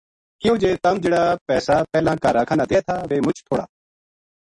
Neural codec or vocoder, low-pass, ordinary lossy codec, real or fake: none; 10.8 kHz; MP3, 48 kbps; real